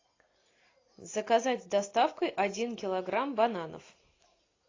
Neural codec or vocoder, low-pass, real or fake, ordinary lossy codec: none; 7.2 kHz; real; AAC, 32 kbps